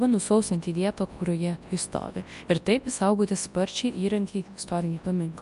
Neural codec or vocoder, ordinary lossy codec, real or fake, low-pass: codec, 24 kHz, 0.9 kbps, WavTokenizer, large speech release; MP3, 64 kbps; fake; 10.8 kHz